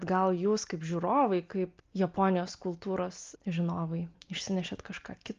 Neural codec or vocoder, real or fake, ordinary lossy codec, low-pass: none; real; Opus, 32 kbps; 7.2 kHz